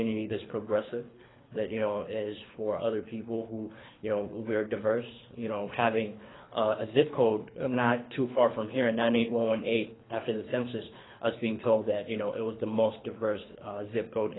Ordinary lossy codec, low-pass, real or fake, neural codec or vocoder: AAC, 16 kbps; 7.2 kHz; fake; codec, 24 kHz, 3 kbps, HILCodec